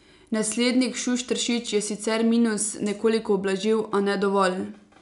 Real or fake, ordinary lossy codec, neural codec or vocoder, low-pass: real; none; none; 10.8 kHz